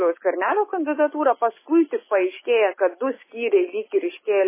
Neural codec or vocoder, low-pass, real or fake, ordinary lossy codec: autoencoder, 48 kHz, 128 numbers a frame, DAC-VAE, trained on Japanese speech; 3.6 kHz; fake; MP3, 16 kbps